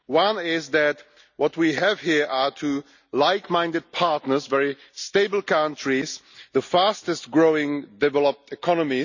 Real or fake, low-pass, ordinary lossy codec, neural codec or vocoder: real; 7.2 kHz; none; none